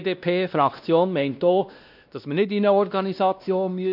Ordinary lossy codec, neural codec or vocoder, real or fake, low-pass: none; codec, 16 kHz, 1 kbps, X-Codec, WavLM features, trained on Multilingual LibriSpeech; fake; 5.4 kHz